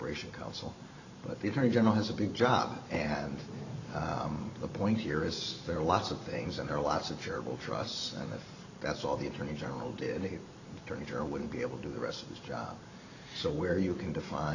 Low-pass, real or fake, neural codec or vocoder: 7.2 kHz; fake; vocoder, 44.1 kHz, 128 mel bands every 512 samples, BigVGAN v2